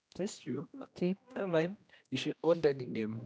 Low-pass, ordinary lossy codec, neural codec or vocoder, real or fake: none; none; codec, 16 kHz, 1 kbps, X-Codec, HuBERT features, trained on general audio; fake